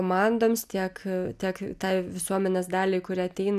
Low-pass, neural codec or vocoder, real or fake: 14.4 kHz; none; real